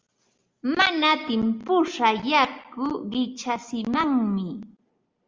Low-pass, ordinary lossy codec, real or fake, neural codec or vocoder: 7.2 kHz; Opus, 32 kbps; real; none